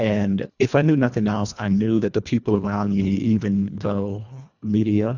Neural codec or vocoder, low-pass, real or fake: codec, 24 kHz, 1.5 kbps, HILCodec; 7.2 kHz; fake